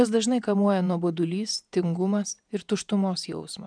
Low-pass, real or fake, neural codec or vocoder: 9.9 kHz; fake; vocoder, 48 kHz, 128 mel bands, Vocos